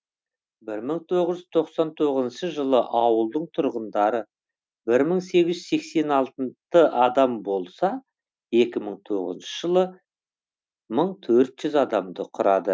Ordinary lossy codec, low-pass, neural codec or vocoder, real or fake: none; none; none; real